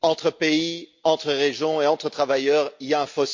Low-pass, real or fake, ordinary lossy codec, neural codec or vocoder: 7.2 kHz; real; MP3, 48 kbps; none